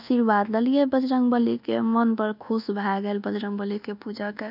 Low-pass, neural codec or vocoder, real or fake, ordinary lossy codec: 5.4 kHz; codec, 24 kHz, 1.2 kbps, DualCodec; fake; none